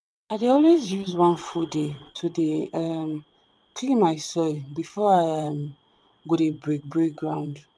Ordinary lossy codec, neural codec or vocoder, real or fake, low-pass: none; vocoder, 22.05 kHz, 80 mel bands, WaveNeXt; fake; none